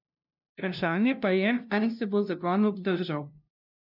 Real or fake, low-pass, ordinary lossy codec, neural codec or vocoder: fake; 5.4 kHz; none; codec, 16 kHz, 0.5 kbps, FunCodec, trained on LibriTTS, 25 frames a second